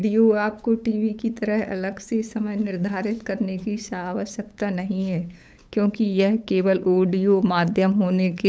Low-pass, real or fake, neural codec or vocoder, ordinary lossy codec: none; fake; codec, 16 kHz, 8 kbps, FunCodec, trained on LibriTTS, 25 frames a second; none